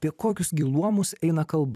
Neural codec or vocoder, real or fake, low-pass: vocoder, 44.1 kHz, 128 mel bands every 256 samples, BigVGAN v2; fake; 14.4 kHz